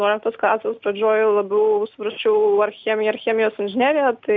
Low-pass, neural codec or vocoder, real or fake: 7.2 kHz; none; real